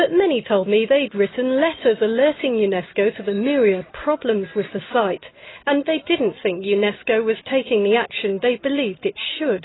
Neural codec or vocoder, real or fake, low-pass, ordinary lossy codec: none; real; 7.2 kHz; AAC, 16 kbps